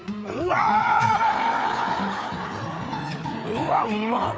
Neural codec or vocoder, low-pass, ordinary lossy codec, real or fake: codec, 16 kHz, 4 kbps, FreqCodec, larger model; none; none; fake